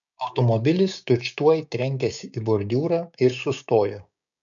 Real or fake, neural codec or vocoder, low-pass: fake; codec, 16 kHz, 6 kbps, DAC; 7.2 kHz